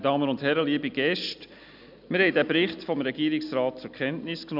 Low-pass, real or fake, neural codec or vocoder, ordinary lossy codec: 5.4 kHz; real; none; none